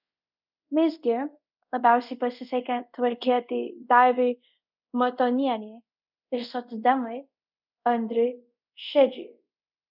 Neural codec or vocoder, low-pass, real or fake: codec, 24 kHz, 0.5 kbps, DualCodec; 5.4 kHz; fake